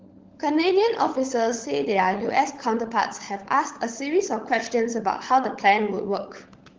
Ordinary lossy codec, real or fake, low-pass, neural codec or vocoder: Opus, 16 kbps; fake; 7.2 kHz; codec, 16 kHz, 16 kbps, FunCodec, trained on LibriTTS, 50 frames a second